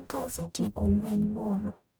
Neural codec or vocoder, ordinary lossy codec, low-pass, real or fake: codec, 44.1 kHz, 0.9 kbps, DAC; none; none; fake